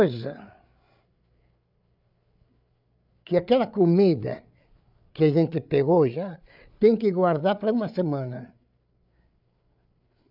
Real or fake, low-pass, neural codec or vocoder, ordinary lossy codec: fake; 5.4 kHz; codec, 16 kHz, 8 kbps, FreqCodec, larger model; none